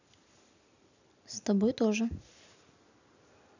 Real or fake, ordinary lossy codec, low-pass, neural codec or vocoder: real; none; 7.2 kHz; none